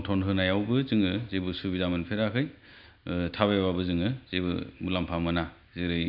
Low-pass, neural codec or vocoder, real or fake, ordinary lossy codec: 5.4 kHz; none; real; none